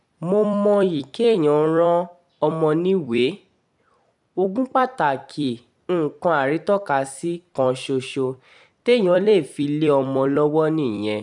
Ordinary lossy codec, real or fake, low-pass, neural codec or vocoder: none; fake; 10.8 kHz; vocoder, 44.1 kHz, 128 mel bands every 256 samples, BigVGAN v2